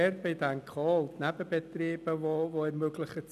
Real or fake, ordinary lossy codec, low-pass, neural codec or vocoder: real; none; 14.4 kHz; none